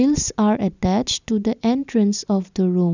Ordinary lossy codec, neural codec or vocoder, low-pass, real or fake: none; none; 7.2 kHz; real